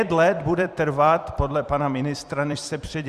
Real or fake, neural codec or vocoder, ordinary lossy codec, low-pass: fake; vocoder, 44.1 kHz, 128 mel bands every 256 samples, BigVGAN v2; Opus, 64 kbps; 14.4 kHz